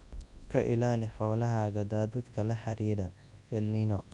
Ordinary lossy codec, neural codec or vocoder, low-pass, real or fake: Opus, 64 kbps; codec, 24 kHz, 0.9 kbps, WavTokenizer, large speech release; 10.8 kHz; fake